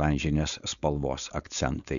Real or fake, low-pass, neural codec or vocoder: fake; 7.2 kHz; codec, 16 kHz, 4.8 kbps, FACodec